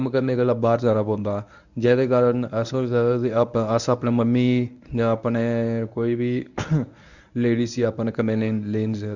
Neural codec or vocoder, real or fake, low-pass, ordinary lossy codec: codec, 24 kHz, 0.9 kbps, WavTokenizer, medium speech release version 1; fake; 7.2 kHz; none